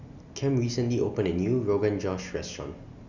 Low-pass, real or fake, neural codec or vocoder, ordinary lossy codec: 7.2 kHz; real; none; none